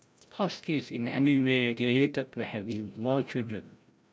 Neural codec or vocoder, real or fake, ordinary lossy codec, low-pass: codec, 16 kHz, 0.5 kbps, FreqCodec, larger model; fake; none; none